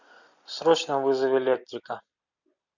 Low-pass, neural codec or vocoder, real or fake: 7.2 kHz; vocoder, 44.1 kHz, 128 mel bands every 256 samples, BigVGAN v2; fake